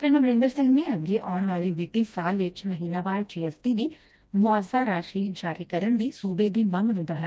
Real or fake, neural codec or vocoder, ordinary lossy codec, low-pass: fake; codec, 16 kHz, 1 kbps, FreqCodec, smaller model; none; none